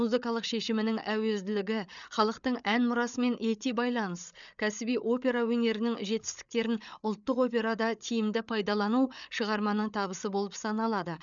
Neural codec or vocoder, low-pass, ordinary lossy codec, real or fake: codec, 16 kHz, 8 kbps, FreqCodec, larger model; 7.2 kHz; none; fake